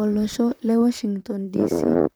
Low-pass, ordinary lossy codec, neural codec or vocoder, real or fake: none; none; vocoder, 44.1 kHz, 128 mel bands, Pupu-Vocoder; fake